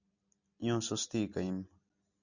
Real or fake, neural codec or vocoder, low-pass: real; none; 7.2 kHz